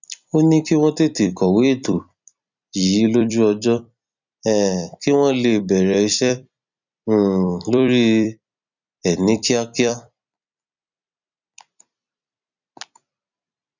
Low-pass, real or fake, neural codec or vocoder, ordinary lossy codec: 7.2 kHz; fake; vocoder, 44.1 kHz, 128 mel bands every 256 samples, BigVGAN v2; none